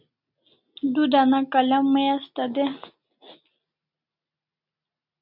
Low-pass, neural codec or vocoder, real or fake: 5.4 kHz; none; real